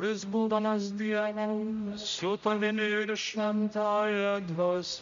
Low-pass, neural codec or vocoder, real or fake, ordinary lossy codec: 7.2 kHz; codec, 16 kHz, 0.5 kbps, X-Codec, HuBERT features, trained on general audio; fake; MP3, 64 kbps